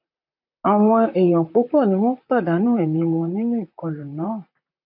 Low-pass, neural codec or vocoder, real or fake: 5.4 kHz; vocoder, 44.1 kHz, 128 mel bands, Pupu-Vocoder; fake